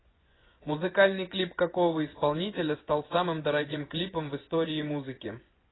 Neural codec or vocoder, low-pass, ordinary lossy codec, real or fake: vocoder, 24 kHz, 100 mel bands, Vocos; 7.2 kHz; AAC, 16 kbps; fake